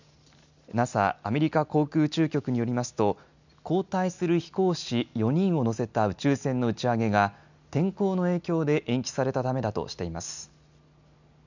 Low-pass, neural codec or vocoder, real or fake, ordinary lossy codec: 7.2 kHz; none; real; none